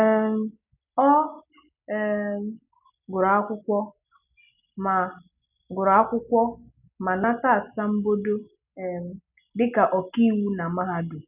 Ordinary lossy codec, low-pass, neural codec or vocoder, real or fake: none; 3.6 kHz; none; real